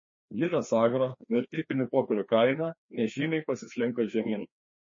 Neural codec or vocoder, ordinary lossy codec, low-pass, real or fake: codec, 16 kHz, 2 kbps, FreqCodec, larger model; MP3, 32 kbps; 7.2 kHz; fake